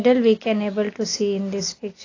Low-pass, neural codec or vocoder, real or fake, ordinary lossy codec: 7.2 kHz; none; real; AAC, 32 kbps